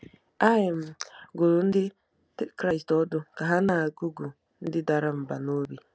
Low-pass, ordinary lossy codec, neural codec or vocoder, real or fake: none; none; none; real